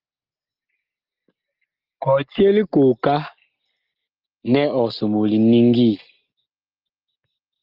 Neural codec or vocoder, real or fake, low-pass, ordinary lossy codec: none; real; 5.4 kHz; Opus, 32 kbps